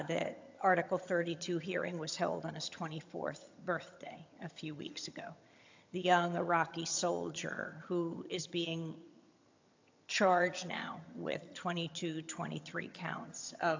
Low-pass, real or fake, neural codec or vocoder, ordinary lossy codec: 7.2 kHz; fake; vocoder, 22.05 kHz, 80 mel bands, HiFi-GAN; AAC, 48 kbps